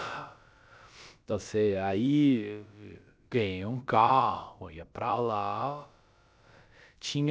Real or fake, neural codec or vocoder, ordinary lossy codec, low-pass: fake; codec, 16 kHz, about 1 kbps, DyCAST, with the encoder's durations; none; none